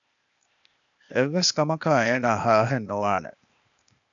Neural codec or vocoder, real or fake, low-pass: codec, 16 kHz, 0.8 kbps, ZipCodec; fake; 7.2 kHz